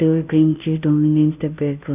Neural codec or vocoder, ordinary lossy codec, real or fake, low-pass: codec, 16 kHz, 0.5 kbps, FunCodec, trained on Chinese and English, 25 frames a second; none; fake; 3.6 kHz